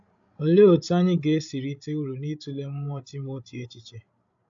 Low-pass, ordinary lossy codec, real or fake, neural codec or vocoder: 7.2 kHz; AAC, 64 kbps; fake; codec, 16 kHz, 16 kbps, FreqCodec, larger model